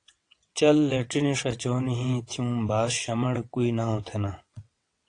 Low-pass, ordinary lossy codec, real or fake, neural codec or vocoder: 9.9 kHz; AAC, 48 kbps; fake; vocoder, 22.05 kHz, 80 mel bands, WaveNeXt